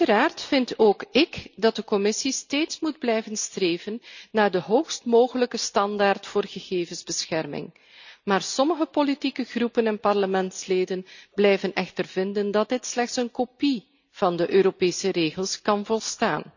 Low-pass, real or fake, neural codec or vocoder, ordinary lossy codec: 7.2 kHz; real; none; none